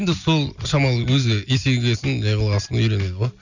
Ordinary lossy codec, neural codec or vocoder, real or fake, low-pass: none; none; real; 7.2 kHz